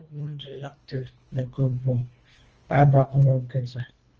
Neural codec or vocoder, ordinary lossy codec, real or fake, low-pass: codec, 24 kHz, 1.5 kbps, HILCodec; Opus, 24 kbps; fake; 7.2 kHz